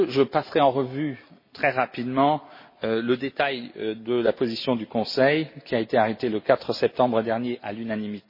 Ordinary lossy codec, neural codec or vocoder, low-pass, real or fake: MP3, 24 kbps; none; 5.4 kHz; real